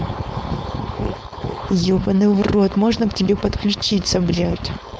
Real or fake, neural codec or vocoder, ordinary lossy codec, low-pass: fake; codec, 16 kHz, 4.8 kbps, FACodec; none; none